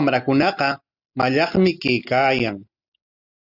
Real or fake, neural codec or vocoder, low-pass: real; none; 5.4 kHz